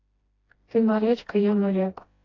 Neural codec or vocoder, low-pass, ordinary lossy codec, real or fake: codec, 16 kHz, 1 kbps, FreqCodec, smaller model; 7.2 kHz; AAC, 32 kbps; fake